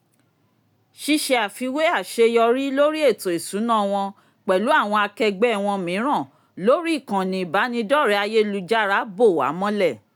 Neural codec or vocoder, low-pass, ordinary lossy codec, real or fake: none; 19.8 kHz; none; real